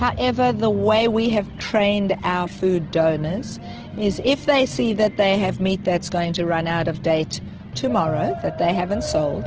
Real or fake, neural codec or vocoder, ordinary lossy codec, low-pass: real; none; Opus, 16 kbps; 7.2 kHz